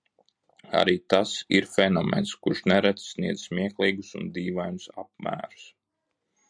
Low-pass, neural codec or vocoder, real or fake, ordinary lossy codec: 9.9 kHz; none; real; MP3, 96 kbps